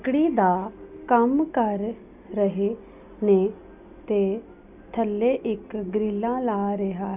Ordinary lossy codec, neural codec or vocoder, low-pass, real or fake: none; none; 3.6 kHz; real